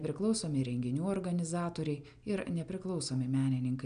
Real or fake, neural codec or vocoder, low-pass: real; none; 9.9 kHz